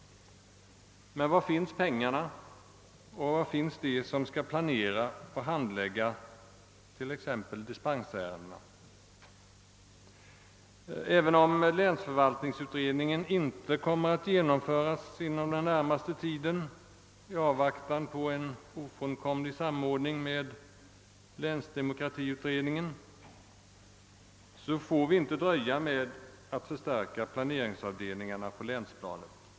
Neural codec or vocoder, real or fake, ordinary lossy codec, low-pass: none; real; none; none